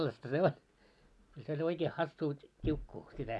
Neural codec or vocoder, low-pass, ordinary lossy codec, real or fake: none; none; none; real